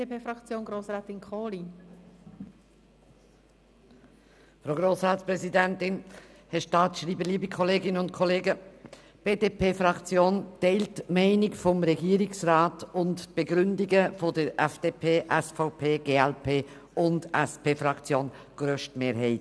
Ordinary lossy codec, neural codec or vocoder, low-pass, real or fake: none; none; none; real